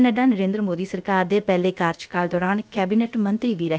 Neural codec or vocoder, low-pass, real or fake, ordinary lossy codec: codec, 16 kHz, about 1 kbps, DyCAST, with the encoder's durations; none; fake; none